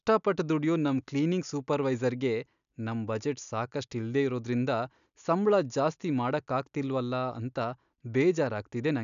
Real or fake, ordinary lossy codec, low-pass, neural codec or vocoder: real; none; 7.2 kHz; none